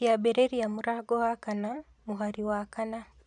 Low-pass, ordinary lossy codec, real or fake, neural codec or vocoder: 10.8 kHz; none; fake; vocoder, 44.1 kHz, 128 mel bands every 512 samples, BigVGAN v2